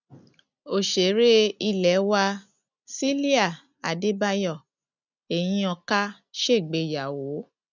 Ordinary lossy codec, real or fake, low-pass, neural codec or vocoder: none; real; 7.2 kHz; none